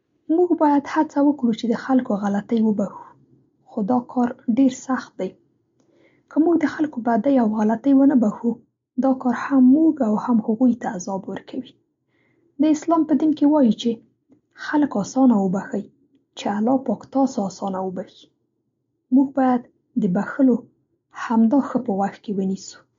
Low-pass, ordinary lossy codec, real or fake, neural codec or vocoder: 7.2 kHz; MP3, 48 kbps; real; none